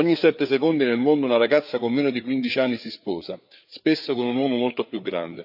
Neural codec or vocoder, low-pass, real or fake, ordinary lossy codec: codec, 16 kHz, 4 kbps, FreqCodec, larger model; 5.4 kHz; fake; none